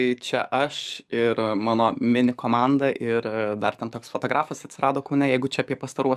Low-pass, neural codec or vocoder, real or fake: 14.4 kHz; codec, 44.1 kHz, 7.8 kbps, DAC; fake